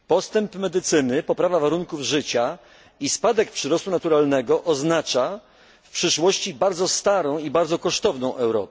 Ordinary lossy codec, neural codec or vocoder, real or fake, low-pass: none; none; real; none